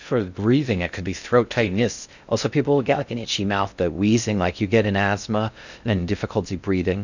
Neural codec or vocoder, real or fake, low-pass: codec, 16 kHz in and 24 kHz out, 0.6 kbps, FocalCodec, streaming, 2048 codes; fake; 7.2 kHz